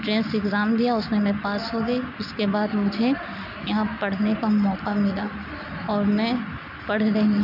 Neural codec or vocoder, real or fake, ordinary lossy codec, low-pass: none; real; none; 5.4 kHz